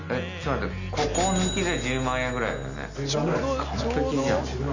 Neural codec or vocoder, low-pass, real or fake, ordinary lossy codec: none; 7.2 kHz; real; none